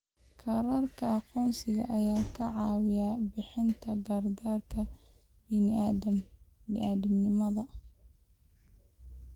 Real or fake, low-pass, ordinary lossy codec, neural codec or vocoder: fake; 19.8 kHz; Opus, 24 kbps; autoencoder, 48 kHz, 128 numbers a frame, DAC-VAE, trained on Japanese speech